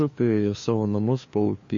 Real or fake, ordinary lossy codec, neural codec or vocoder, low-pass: fake; MP3, 32 kbps; codec, 16 kHz, 2 kbps, FunCodec, trained on LibriTTS, 25 frames a second; 7.2 kHz